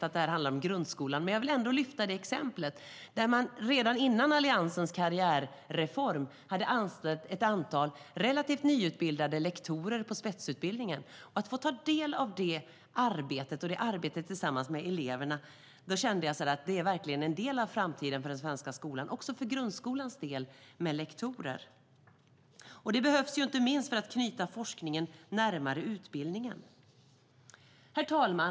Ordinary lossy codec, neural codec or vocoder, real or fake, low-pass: none; none; real; none